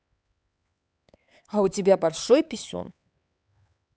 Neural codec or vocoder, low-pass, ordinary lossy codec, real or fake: codec, 16 kHz, 4 kbps, X-Codec, HuBERT features, trained on LibriSpeech; none; none; fake